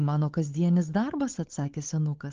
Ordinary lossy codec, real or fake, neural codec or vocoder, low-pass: Opus, 16 kbps; fake; codec, 16 kHz, 8 kbps, FunCodec, trained on Chinese and English, 25 frames a second; 7.2 kHz